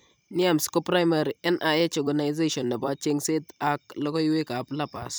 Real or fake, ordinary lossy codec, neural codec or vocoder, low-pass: fake; none; vocoder, 44.1 kHz, 128 mel bands every 256 samples, BigVGAN v2; none